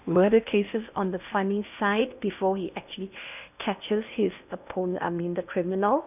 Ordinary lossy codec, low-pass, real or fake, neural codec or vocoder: none; 3.6 kHz; fake; codec, 16 kHz in and 24 kHz out, 0.8 kbps, FocalCodec, streaming, 65536 codes